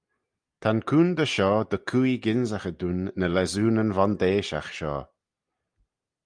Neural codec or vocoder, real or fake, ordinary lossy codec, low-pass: none; real; Opus, 32 kbps; 9.9 kHz